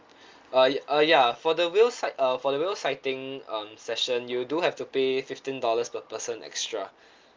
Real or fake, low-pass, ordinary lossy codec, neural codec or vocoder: real; 7.2 kHz; Opus, 32 kbps; none